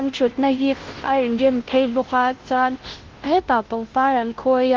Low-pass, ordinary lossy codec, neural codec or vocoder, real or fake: 7.2 kHz; Opus, 16 kbps; codec, 16 kHz, 0.5 kbps, FunCodec, trained on Chinese and English, 25 frames a second; fake